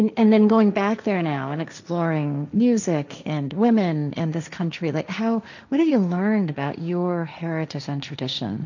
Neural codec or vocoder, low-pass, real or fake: codec, 16 kHz, 1.1 kbps, Voila-Tokenizer; 7.2 kHz; fake